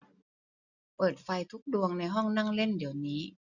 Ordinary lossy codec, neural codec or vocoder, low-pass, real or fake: none; none; 7.2 kHz; real